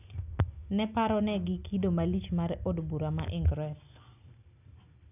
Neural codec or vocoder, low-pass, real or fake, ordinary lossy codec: none; 3.6 kHz; real; none